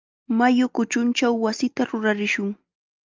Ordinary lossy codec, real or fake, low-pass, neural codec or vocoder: Opus, 24 kbps; real; 7.2 kHz; none